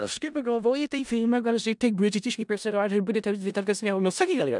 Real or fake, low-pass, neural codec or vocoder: fake; 10.8 kHz; codec, 16 kHz in and 24 kHz out, 0.4 kbps, LongCat-Audio-Codec, four codebook decoder